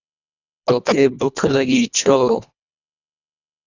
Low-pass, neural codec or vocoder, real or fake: 7.2 kHz; codec, 24 kHz, 1.5 kbps, HILCodec; fake